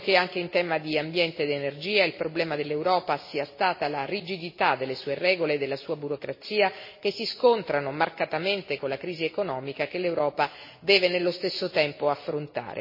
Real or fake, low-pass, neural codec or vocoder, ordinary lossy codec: real; 5.4 kHz; none; MP3, 24 kbps